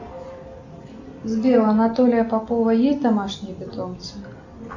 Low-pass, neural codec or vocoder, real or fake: 7.2 kHz; none; real